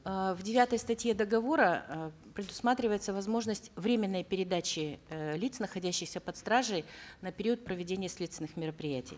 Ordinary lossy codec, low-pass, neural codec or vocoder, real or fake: none; none; none; real